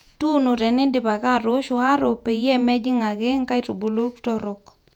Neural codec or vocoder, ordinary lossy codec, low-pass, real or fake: vocoder, 48 kHz, 128 mel bands, Vocos; none; 19.8 kHz; fake